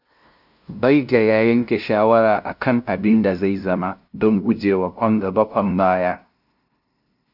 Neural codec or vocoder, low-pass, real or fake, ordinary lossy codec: codec, 16 kHz, 0.5 kbps, FunCodec, trained on LibriTTS, 25 frames a second; 5.4 kHz; fake; none